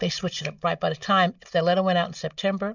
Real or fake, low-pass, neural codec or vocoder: real; 7.2 kHz; none